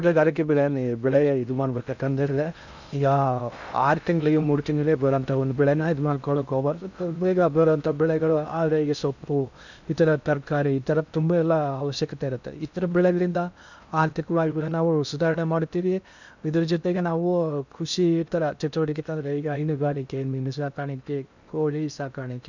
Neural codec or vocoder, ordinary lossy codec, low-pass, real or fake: codec, 16 kHz in and 24 kHz out, 0.6 kbps, FocalCodec, streaming, 2048 codes; none; 7.2 kHz; fake